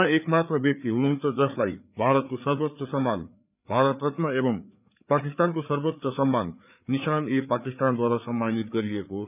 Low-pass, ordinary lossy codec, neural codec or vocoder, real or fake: 3.6 kHz; none; codec, 16 kHz, 4 kbps, FreqCodec, larger model; fake